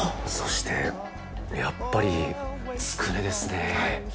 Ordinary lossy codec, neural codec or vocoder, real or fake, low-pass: none; none; real; none